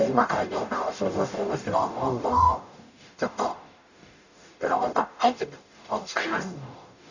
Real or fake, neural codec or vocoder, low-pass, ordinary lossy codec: fake; codec, 44.1 kHz, 0.9 kbps, DAC; 7.2 kHz; none